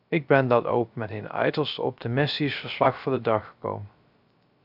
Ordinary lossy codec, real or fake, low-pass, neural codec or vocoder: AAC, 48 kbps; fake; 5.4 kHz; codec, 16 kHz, 0.3 kbps, FocalCodec